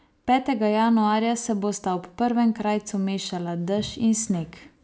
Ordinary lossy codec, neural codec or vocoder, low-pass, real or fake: none; none; none; real